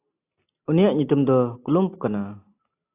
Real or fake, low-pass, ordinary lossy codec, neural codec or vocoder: real; 3.6 kHz; AAC, 24 kbps; none